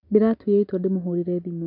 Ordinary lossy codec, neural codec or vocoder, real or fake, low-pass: none; none; real; 5.4 kHz